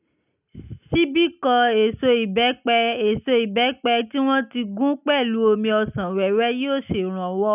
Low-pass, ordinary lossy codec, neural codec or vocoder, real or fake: 3.6 kHz; none; none; real